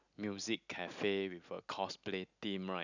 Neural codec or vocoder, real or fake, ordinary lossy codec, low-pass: none; real; none; 7.2 kHz